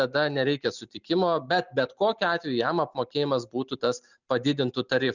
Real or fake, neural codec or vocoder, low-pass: real; none; 7.2 kHz